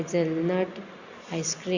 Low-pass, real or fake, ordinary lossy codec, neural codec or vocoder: 7.2 kHz; real; Opus, 64 kbps; none